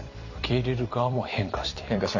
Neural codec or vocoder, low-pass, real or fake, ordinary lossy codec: vocoder, 44.1 kHz, 128 mel bands every 256 samples, BigVGAN v2; 7.2 kHz; fake; none